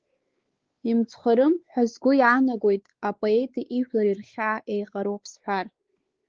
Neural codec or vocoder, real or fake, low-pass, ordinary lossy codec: codec, 16 kHz, 4 kbps, X-Codec, WavLM features, trained on Multilingual LibriSpeech; fake; 7.2 kHz; Opus, 16 kbps